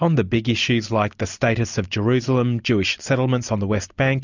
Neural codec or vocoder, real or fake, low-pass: none; real; 7.2 kHz